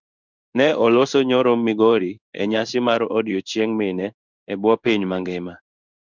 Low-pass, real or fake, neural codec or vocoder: 7.2 kHz; fake; codec, 16 kHz in and 24 kHz out, 1 kbps, XY-Tokenizer